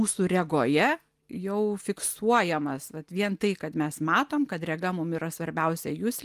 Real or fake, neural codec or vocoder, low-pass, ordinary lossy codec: real; none; 14.4 kHz; Opus, 32 kbps